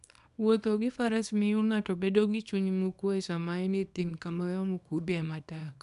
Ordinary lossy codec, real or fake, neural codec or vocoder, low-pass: none; fake; codec, 24 kHz, 0.9 kbps, WavTokenizer, small release; 10.8 kHz